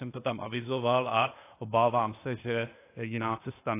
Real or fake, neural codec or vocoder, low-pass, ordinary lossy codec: fake; codec, 16 kHz, 0.8 kbps, ZipCodec; 3.6 kHz; AAC, 24 kbps